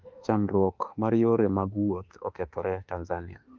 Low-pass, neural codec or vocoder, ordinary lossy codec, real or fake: 7.2 kHz; autoencoder, 48 kHz, 32 numbers a frame, DAC-VAE, trained on Japanese speech; Opus, 24 kbps; fake